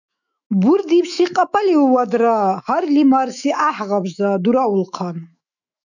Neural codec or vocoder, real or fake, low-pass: autoencoder, 48 kHz, 128 numbers a frame, DAC-VAE, trained on Japanese speech; fake; 7.2 kHz